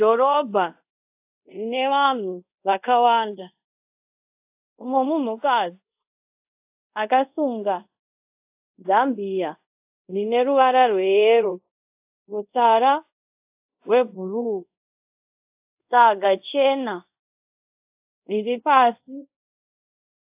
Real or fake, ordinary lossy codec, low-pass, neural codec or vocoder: fake; AAC, 32 kbps; 3.6 kHz; codec, 24 kHz, 0.5 kbps, DualCodec